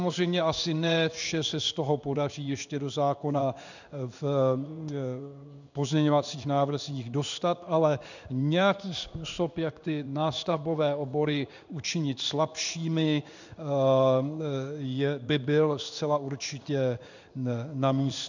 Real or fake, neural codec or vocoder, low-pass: fake; codec, 16 kHz in and 24 kHz out, 1 kbps, XY-Tokenizer; 7.2 kHz